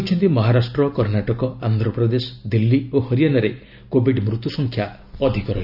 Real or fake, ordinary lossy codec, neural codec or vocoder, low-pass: real; none; none; 5.4 kHz